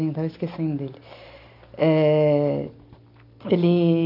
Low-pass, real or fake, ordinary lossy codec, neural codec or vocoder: 5.4 kHz; real; AAC, 32 kbps; none